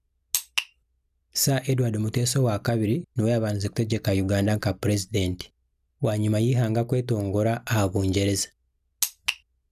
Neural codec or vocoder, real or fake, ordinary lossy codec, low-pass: none; real; none; 14.4 kHz